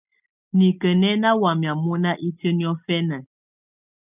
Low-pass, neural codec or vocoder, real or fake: 3.6 kHz; none; real